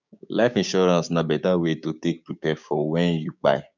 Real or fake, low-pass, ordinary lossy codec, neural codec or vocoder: fake; 7.2 kHz; none; codec, 16 kHz, 6 kbps, DAC